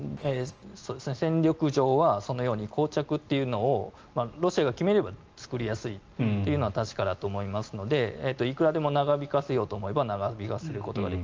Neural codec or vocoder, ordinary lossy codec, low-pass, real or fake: none; Opus, 24 kbps; 7.2 kHz; real